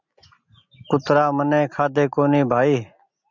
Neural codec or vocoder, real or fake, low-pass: none; real; 7.2 kHz